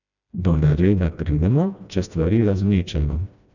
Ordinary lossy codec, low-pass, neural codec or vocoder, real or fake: none; 7.2 kHz; codec, 16 kHz, 1 kbps, FreqCodec, smaller model; fake